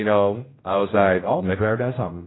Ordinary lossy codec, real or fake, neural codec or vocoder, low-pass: AAC, 16 kbps; fake; codec, 16 kHz, 0.5 kbps, X-Codec, HuBERT features, trained on general audio; 7.2 kHz